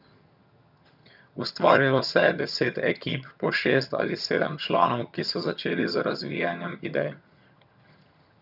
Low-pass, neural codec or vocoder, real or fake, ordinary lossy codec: 5.4 kHz; vocoder, 22.05 kHz, 80 mel bands, HiFi-GAN; fake; none